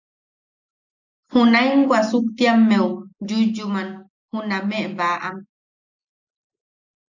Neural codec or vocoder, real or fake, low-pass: none; real; 7.2 kHz